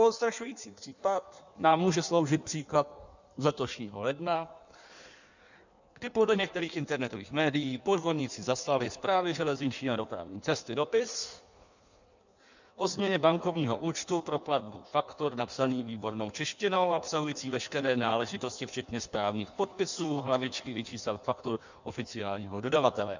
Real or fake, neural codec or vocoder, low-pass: fake; codec, 16 kHz in and 24 kHz out, 1.1 kbps, FireRedTTS-2 codec; 7.2 kHz